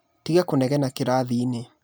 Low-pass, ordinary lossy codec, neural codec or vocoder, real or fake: none; none; none; real